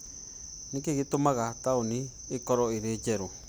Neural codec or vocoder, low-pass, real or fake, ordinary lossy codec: none; none; real; none